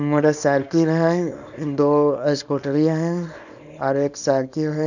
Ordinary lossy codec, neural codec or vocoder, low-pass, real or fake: none; codec, 24 kHz, 0.9 kbps, WavTokenizer, small release; 7.2 kHz; fake